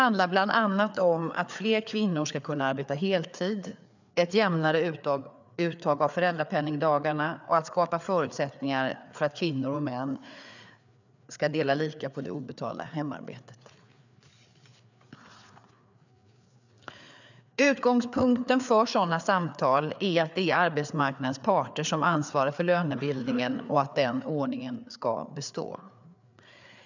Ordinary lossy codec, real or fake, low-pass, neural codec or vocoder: none; fake; 7.2 kHz; codec, 16 kHz, 4 kbps, FreqCodec, larger model